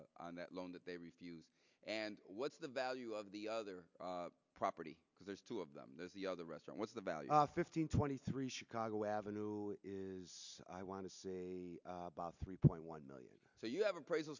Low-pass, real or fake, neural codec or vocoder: 7.2 kHz; real; none